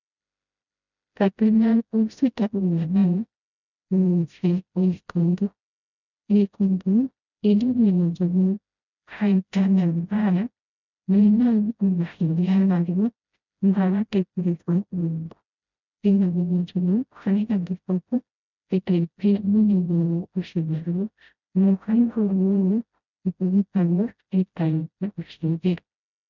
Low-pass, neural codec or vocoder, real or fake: 7.2 kHz; codec, 16 kHz, 0.5 kbps, FreqCodec, smaller model; fake